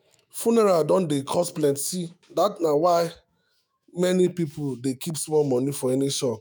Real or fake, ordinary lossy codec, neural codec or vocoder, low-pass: fake; none; autoencoder, 48 kHz, 128 numbers a frame, DAC-VAE, trained on Japanese speech; none